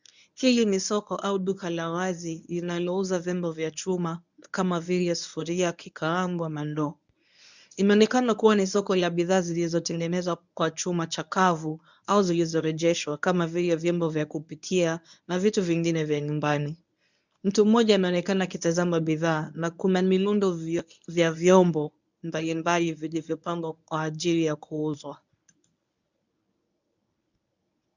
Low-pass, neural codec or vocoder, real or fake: 7.2 kHz; codec, 24 kHz, 0.9 kbps, WavTokenizer, medium speech release version 1; fake